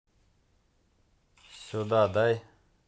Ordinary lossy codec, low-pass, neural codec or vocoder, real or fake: none; none; none; real